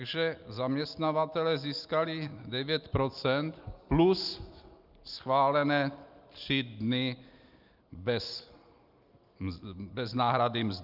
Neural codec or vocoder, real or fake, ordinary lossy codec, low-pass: none; real; Opus, 24 kbps; 5.4 kHz